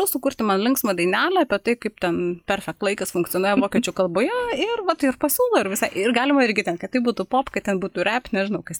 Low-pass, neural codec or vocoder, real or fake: 19.8 kHz; none; real